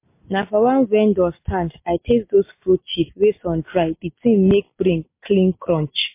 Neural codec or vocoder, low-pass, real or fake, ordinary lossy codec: none; 3.6 kHz; real; MP3, 24 kbps